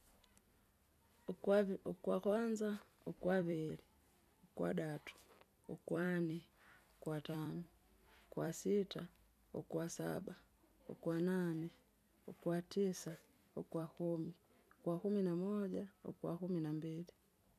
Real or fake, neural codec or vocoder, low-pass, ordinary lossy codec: fake; vocoder, 44.1 kHz, 128 mel bands every 256 samples, BigVGAN v2; 14.4 kHz; none